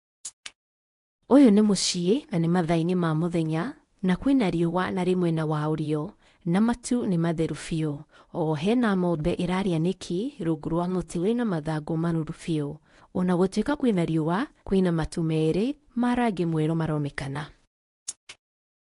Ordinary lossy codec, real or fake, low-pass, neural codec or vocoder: AAC, 48 kbps; fake; 10.8 kHz; codec, 24 kHz, 0.9 kbps, WavTokenizer, medium speech release version 1